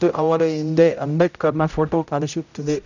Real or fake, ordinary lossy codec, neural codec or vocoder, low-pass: fake; none; codec, 16 kHz, 0.5 kbps, X-Codec, HuBERT features, trained on general audio; 7.2 kHz